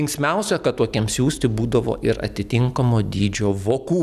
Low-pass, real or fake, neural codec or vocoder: 14.4 kHz; real; none